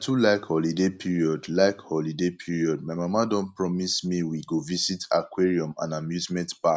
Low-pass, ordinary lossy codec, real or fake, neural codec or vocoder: none; none; real; none